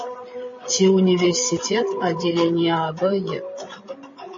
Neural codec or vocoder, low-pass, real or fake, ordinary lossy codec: codec, 16 kHz, 8 kbps, FreqCodec, larger model; 7.2 kHz; fake; MP3, 32 kbps